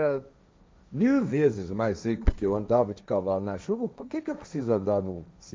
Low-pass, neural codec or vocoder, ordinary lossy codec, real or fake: none; codec, 16 kHz, 1.1 kbps, Voila-Tokenizer; none; fake